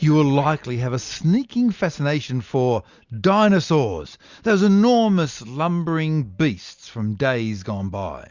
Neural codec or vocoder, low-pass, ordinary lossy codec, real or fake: none; 7.2 kHz; Opus, 64 kbps; real